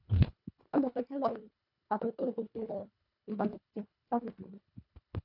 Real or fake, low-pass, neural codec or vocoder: fake; 5.4 kHz; codec, 24 kHz, 1.5 kbps, HILCodec